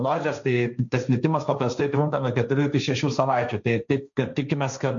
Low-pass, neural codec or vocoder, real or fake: 7.2 kHz; codec, 16 kHz, 1.1 kbps, Voila-Tokenizer; fake